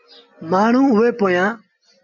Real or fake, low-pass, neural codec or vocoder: real; 7.2 kHz; none